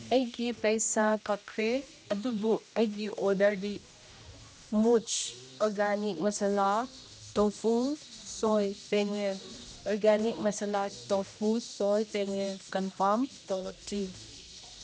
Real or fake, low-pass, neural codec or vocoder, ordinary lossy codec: fake; none; codec, 16 kHz, 1 kbps, X-Codec, HuBERT features, trained on general audio; none